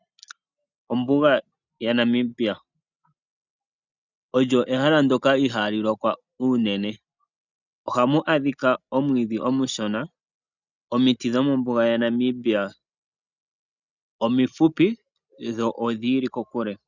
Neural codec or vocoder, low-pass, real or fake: none; 7.2 kHz; real